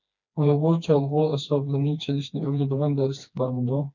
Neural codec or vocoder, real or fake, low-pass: codec, 16 kHz, 2 kbps, FreqCodec, smaller model; fake; 7.2 kHz